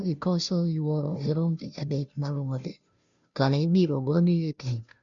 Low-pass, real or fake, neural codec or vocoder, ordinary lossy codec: 7.2 kHz; fake; codec, 16 kHz, 0.5 kbps, FunCodec, trained on Chinese and English, 25 frames a second; none